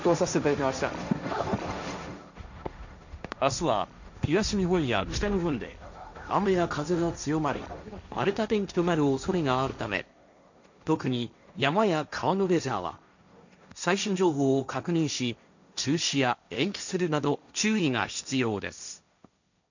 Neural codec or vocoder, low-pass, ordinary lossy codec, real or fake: codec, 16 kHz, 1.1 kbps, Voila-Tokenizer; 7.2 kHz; none; fake